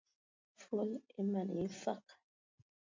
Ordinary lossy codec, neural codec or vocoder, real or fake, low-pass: MP3, 64 kbps; none; real; 7.2 kHz